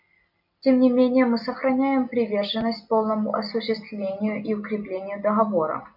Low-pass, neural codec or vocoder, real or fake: 5.4 kHz; none; real